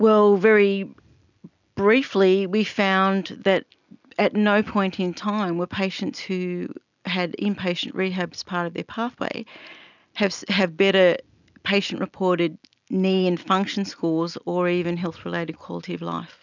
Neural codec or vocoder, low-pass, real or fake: none; 7.2 kHz; real